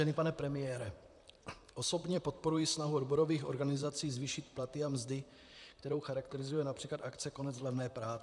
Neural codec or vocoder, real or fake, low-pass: vocoder, 44.1 kHz, 128 mel bands, Pupu-Vocoder; fake; 10.8 kHz